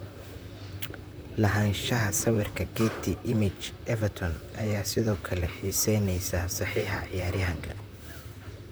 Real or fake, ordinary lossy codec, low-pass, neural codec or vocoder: fake; none; none; vocoder, 44.1 kHz, 128 mel bands, Pupu-Vocoder